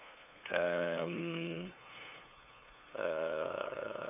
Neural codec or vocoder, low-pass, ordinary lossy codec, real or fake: codec, 16 kHz, 2 kbps, FunCodec, trained on LibriTTS, 25 frames a second; 3.6 kHz; none; fake